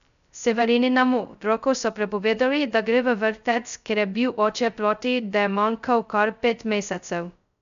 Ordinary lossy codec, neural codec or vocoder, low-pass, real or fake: none; codec, 16 kHz, 0.2 kbps, FocalCodec; 7.2 kHz; fake